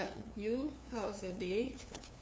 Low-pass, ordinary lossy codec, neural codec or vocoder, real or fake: none; none; codec, 16 kHz, 2 kbps, FunCodec, trained on LibriTTS, 25 frames a second; fake